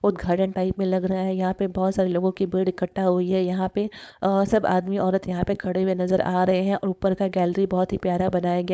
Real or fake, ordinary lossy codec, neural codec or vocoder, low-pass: fake; none; codec, 16 kHz, 4.8 kbps, FACodec; none